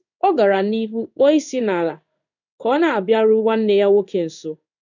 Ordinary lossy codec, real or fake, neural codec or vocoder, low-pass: none; fake; codec, 16 kHz in and 24 kHz out, 1 kbps, XY-Tokenizer; 7.2 kHz